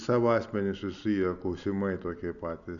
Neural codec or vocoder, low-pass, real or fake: none; 7.2 kHz; real